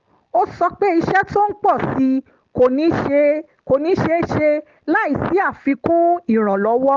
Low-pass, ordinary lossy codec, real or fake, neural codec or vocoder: 7.2 kHz; Opus, 16 kbps; real; none